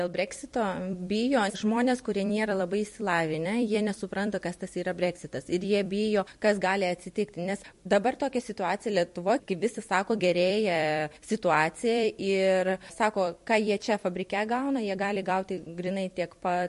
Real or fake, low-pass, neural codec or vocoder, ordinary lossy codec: fake; 14.4 kHz; vocoder, 44.1 kHz, 128 mel bands every 256 samples, BigVGAN v2; MP3, 48 kbps